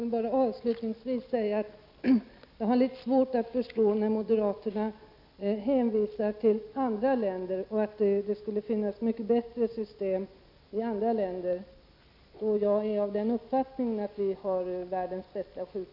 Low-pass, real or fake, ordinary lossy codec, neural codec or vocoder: 5.4 kHz; real; none; none